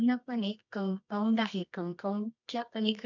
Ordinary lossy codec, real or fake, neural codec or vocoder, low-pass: none; fake; codec, 24 kHz, 0.9 kbps, WavTokenizer, medium music audio release; 7.2 kHz